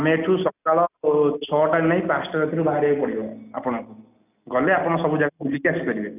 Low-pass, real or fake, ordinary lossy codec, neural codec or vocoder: 3.6 kHz; real; none; none